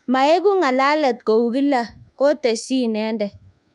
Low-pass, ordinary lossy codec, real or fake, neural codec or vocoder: 10.8 kHz; none; fake; codec, 24 kHz, 1.2 kbps, DualCodec